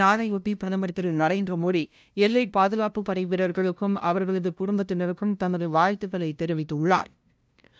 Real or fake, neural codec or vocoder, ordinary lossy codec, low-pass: fake; codec, 16 kHz, 0.5 kbps, FunCodec, trained on LibriTTS, 25 frames a second; none; none